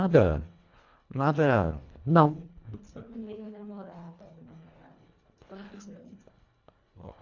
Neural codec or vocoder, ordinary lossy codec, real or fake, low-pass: codec, 24 kHz, 1.5 kbps, HILCodec; AAC, 48 kbps; fake; 7.2 kHz